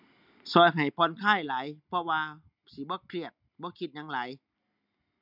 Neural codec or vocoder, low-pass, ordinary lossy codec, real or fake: none; 5.4 kHz; none; real